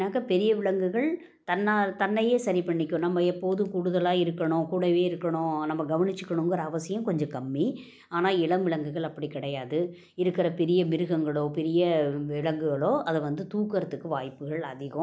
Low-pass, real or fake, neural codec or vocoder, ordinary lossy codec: none; real; none; none